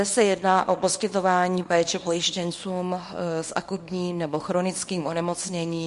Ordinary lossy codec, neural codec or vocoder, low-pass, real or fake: MP3, 48 kbps; codec, 24 kHz, 0.9 kbps, WavTokenizer, small release; 10.8 kHz; fake